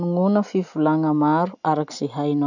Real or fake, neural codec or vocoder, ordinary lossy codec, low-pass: real; none; none; 7.2 kHz